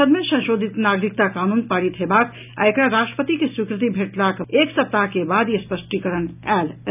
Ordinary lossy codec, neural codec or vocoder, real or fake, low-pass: none; none; real; 3.6 kHz